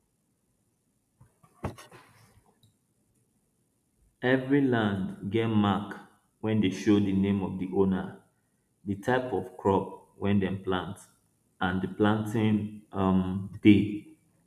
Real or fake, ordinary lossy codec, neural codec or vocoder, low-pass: real; none; none; 14.4 kHz